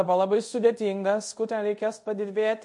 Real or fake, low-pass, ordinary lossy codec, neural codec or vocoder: fake; 10.8 kHz; MP3, 48 kbps; codec, 24 kHz, 0.5 kbps, DualCodec